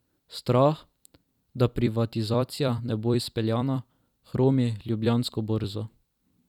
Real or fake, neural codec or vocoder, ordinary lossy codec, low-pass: fake; vocoder, 44.1 kHz, 128 mel bands every 256 samples, BigVGAN v2; none; 19.8 kHz